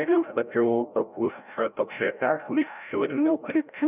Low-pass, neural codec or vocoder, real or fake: 3.6 kHz; codec, 16 kHz, 0.5 kbps, FreqCodec, larger model; fake